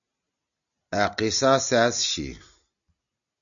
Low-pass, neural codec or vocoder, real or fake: 7.2 kHz; none; real